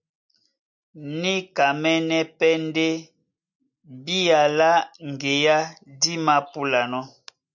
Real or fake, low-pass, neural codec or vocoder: real; 7.2 kHz; none